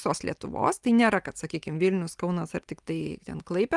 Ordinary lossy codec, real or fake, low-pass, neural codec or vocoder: Opus, 32 kbps; real; 10.8 kHz; none